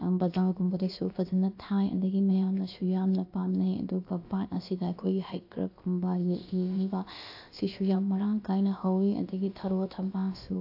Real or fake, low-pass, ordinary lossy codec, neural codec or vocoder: fake; 5.4 kHz; AAC, 32 kbps; codec, 16 kHz, about 1 kbps, DyCAST, with the encoder's durations